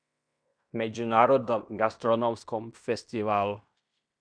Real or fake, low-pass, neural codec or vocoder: fake; 9.9 kHz; codec, 16 kHz in and 24 kHz out, 0.9 kbps, LongCat-Audio-Codec, fine tuned four codebook decoder